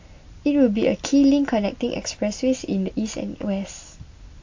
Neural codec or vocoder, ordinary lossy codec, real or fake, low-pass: none; AAC, 48 kbps; real; 7.2 kHz